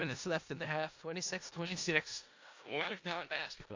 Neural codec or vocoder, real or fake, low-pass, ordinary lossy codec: codec, 16 kHz in and 24 kHz out, 0.4 kbps, LongCat-Audio-Codec, four codebook decoder; fake; 7.2 kHz; AAC, 48 kbps